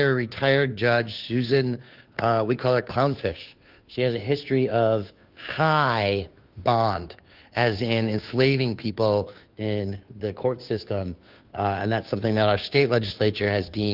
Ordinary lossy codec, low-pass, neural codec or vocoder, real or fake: Opus, 24 kbps; 5.4 kHz; codec, 16 kHz, 2 kbps, FunCodec, trained on Chinese and English, 25 frames a second; fake